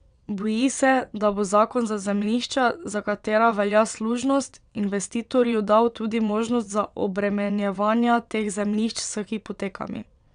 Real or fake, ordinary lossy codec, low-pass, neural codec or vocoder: fake; none; 9.9 kHz; vocoder, 22.05 kHz, 80 mel bands, WaveNeXt